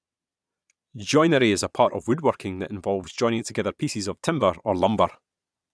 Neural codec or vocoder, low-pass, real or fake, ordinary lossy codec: vocoder, 22.05 kHz, 80 mel bands, Vocos; none; fake; none